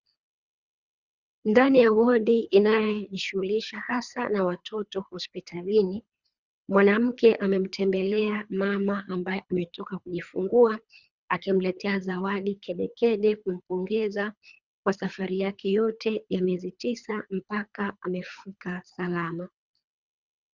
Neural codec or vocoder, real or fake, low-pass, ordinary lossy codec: codec, 24 kHz, 3 kbps, HILCodec; fake; 7.2 kHz; Opus, 64 kbps